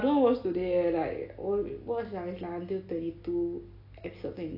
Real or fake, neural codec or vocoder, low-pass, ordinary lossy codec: real; none; 5.4 kHz; AAC, 32 kbps